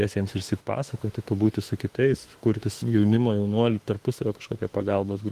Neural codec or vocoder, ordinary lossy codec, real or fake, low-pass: autoencoder, 48 kHz, 32 numbers a frame, DAC-VAE, trained on Japanese speech; Opus, 24 kbps; fake; 14.4 kHz